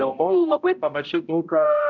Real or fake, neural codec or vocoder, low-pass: fake; codec, 16 kHz, 0.5 kbps, X-Codec, HuBERT features, trained on balanced general audio; 7.2 kHz